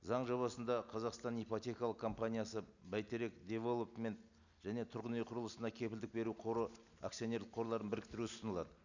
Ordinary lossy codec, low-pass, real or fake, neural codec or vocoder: none; 7.2 kHz; real; none